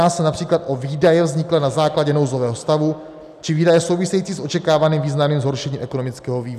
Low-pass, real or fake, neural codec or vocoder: 14.4 kHz; real; none